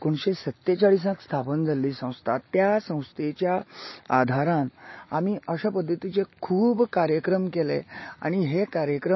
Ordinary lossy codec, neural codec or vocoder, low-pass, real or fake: MP3, 24 kbps; none; 7.2 kHz; real